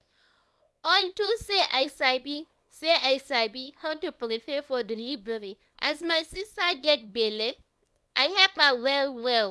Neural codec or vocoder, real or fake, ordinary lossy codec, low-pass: codec, 24 kHz, 0.9 kbps, WavTokenizer, small release; fake; none; none